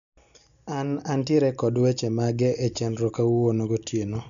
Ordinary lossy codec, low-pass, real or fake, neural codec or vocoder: none; 7.2 kHz; real; none